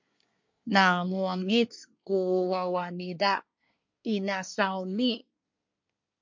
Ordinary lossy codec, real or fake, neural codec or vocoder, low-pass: MP3, 48 kbps; fake; codec, 24 kHz, 1 kbps, SNAC; 7.2 kHz